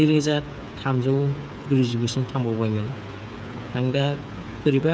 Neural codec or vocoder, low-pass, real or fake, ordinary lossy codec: codec, 16 kHz, 8 kbps, FreqCodec, smaller model; none; fake; none